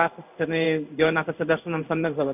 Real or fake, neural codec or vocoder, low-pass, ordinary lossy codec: fake; codec, 16 kHz in and 24 kHz out, 1 kbps, XY-Tokenizer; 3.6 kHz; none